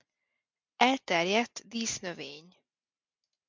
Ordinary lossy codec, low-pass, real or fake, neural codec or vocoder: MP3, 64 kbps; 7.2 kHz; real; none